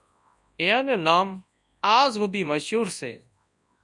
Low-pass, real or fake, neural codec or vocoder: 10.8 kHz; fake; codec, 24 kHz, 0.9 kbps, WavTokenizer, large speech release